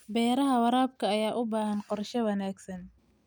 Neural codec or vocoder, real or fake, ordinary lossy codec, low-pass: none; real; none; none